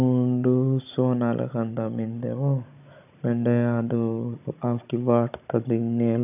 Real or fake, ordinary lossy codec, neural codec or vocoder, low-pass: fake; none; codec, 16 kHz, 16 kbps, FunCodec, trained on Chinese and English, 50 frames a second; 3.6 kHz